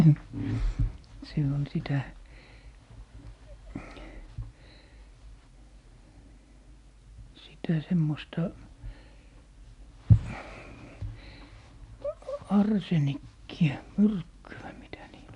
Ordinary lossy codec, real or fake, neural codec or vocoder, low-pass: MP3, 96 kbps; real; none; 10.8 kHz